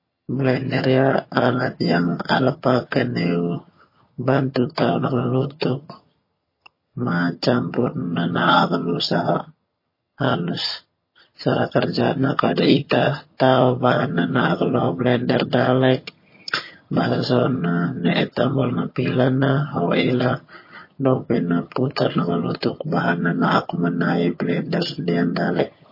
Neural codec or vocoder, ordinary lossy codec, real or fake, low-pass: vocoder, 22.05 kHz, 80 mel bands, HiFi-GAN; MP3, 24 kbps; fake; 5.4 kHz